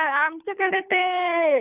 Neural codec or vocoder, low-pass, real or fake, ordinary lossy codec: codec, 24 kHz, 3 kbps, HILCodec; 3.6 kHz; fake; none